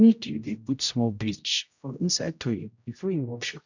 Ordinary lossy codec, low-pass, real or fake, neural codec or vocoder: none; 7.2 kHz; fake; codec, 16 kHz, 0.5 kbps, X-Codec, HuBERT features, trained on general audio